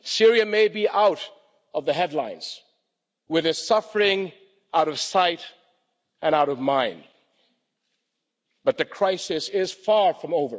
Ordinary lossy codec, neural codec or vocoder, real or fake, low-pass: none; none; real; none